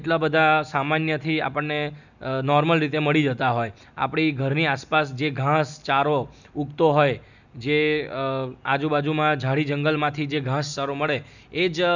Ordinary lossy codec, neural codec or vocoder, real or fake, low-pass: none; none; real; 7.2 kHz